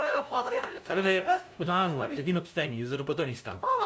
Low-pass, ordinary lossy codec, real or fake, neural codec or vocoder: none; none; fake; codec, 16 kHz, 0.5 kbps, FunCodec, trained on LibriTTS, 25 frames a second